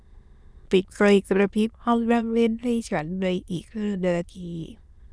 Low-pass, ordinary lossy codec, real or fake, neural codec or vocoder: none; none; fake; autoencoder, 22.05 kHz, a latent of 192 numbers a frame, VITS, trained on many speakers